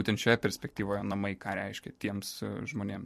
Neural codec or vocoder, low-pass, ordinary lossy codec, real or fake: vocoder, 44.1 kHz, 128 mel bands every 256 samples, BigVGAN v2; 14.4 kHz; MP3, 64 kbps; fake